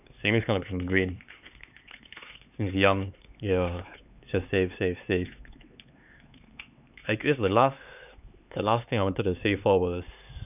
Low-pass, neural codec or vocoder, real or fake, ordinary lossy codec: 3.6 kHz; codec, 16 kHz, 4 kbps, X-Codec, HuBERT features, trained on LibriSpeech; fake; none